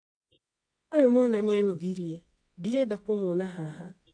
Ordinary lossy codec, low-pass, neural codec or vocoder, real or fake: AAC, 64 kbps; 9.9 kHz; codec, 24 kHz, 0.9 kbps, WavTokenizer, medium music audio release; fake